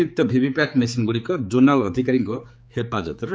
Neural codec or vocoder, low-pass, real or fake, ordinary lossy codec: codec, 16 kHz, 4 kbps, X-Codec, HuBERT features, trained on general audio; none; fake; none